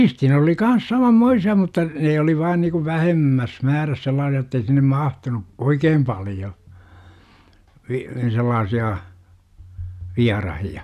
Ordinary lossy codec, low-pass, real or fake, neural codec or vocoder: none; 14.4 kHz; real; none